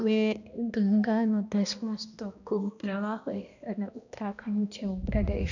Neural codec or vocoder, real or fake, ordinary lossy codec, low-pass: codec, 16 kHz, 1 kbps, X-Codec, HuBERT features, trained on balanced general audio; fake; none; 7.2 kHz